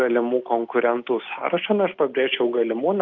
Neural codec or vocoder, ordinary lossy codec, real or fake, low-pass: none; Opus, 32 kbps; real; 7.2 kHz